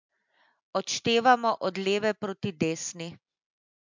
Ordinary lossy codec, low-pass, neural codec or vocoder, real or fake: MP3, 64 kbps; 7.2 kHz; none; real